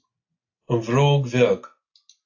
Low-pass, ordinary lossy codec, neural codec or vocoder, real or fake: 7.2 kHz; AAC, 48 kbps; none; real